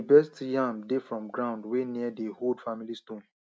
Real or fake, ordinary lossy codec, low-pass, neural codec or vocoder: real; none; none; none